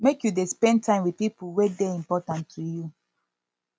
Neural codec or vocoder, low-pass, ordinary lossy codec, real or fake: none; none; none; real